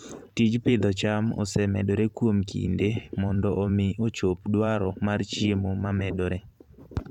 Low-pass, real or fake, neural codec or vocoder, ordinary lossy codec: 19.8 kHz; fake; vocoder, 44.1 kHz, 128 mel bands every 512 samples, BigVGAN v2; none